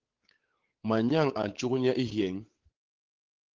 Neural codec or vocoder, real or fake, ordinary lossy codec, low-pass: codec, 16 kHz, 8 kbps, FunCodec, trained on Chinese and English, 25 frames a second; fake; Opus, 16 kbps; 7.2 kHz